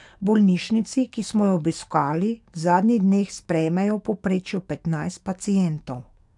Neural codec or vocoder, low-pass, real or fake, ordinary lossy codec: codec, 44.1 kHz, 7.8 kbps, DAC; 10.8 kHz; fake; none